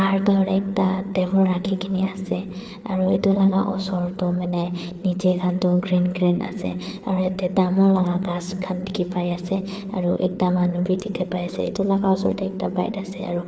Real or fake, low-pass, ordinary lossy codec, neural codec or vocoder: fake; none; none; codec, 16 kHz, 4 kbps, FreqCodec, larger model